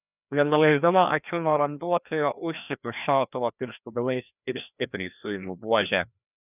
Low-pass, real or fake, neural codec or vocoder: 3.6 kHz; fake; codec, 16 kHz, 1 kbps, FreqCodec, larger model